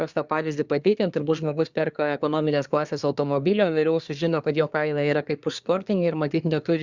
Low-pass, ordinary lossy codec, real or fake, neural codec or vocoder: 7.2 kHz; Opus, 64 kbps; fake; codec, 24 kHz, 1 kbps, SNAC